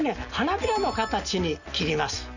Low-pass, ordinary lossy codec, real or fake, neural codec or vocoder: 7.2 kHz; none; real; none